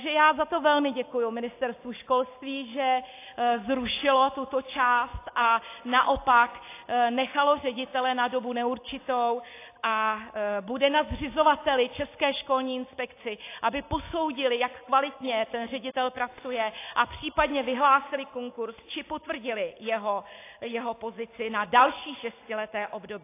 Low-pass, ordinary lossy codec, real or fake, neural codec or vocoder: 3.6 kHz; AAC, 24 kbps; real; none